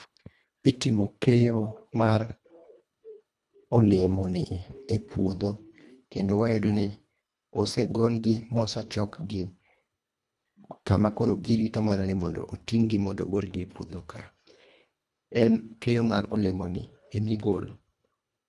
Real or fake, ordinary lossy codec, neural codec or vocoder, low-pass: fake; none; codec, 24 kHz, 1.5 kbps, HILCodec; none